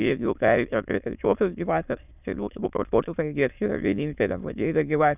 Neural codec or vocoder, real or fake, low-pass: autoencoder, 22.05 kHz, a latent of 192 numbers a frame, VITS, trained on many speakers; fake; 3.6 kHz